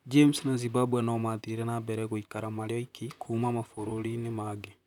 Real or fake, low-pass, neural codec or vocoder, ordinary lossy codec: fake; 19.8 kHz; vocoder, 44.1 kHz, 128 mel bands, Pupu-Vocoder; none